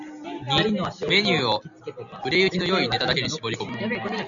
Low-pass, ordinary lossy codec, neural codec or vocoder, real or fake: 7.2 kHz; MP3, 96 kbps; none; real